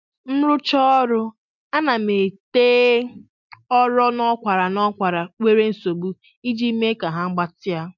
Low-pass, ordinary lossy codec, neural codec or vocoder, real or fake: 7.2 kHz; MP3, 64 kbps; none; real